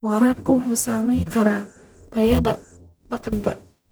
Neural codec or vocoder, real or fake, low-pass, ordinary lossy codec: codec, 44.1 kHz, 0.9 kbps, DAC; fake; none; none